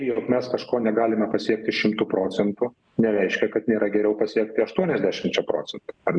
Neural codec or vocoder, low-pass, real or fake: none; 9.9 kHz; real